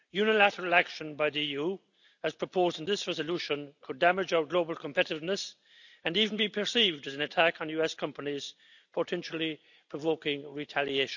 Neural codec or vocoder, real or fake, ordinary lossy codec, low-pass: none; real; none; 7.2 kHz